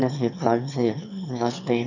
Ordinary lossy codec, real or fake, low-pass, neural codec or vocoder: none; fake; 7.2 kHz; autoencoder, 22.05 kHz, a latent of 192 numbers a frame, VITS, trained on one speaker